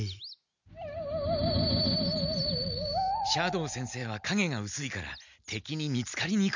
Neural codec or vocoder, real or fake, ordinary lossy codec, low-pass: none; real; none; 7.2 kHz